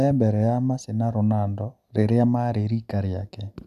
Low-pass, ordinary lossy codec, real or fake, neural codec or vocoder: 14.4 kHz; none; real; none